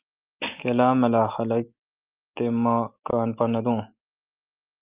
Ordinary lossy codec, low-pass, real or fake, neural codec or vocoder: Opus, 24 kbps; 3.6 kHz; real; none